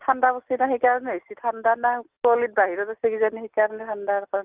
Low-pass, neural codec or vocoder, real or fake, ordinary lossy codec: 3.6 kHz; none; real; Opus, 24 kbps